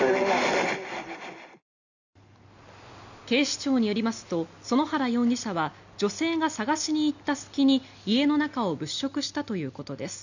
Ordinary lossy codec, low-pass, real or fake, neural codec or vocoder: none; 7.2 kHz; real; none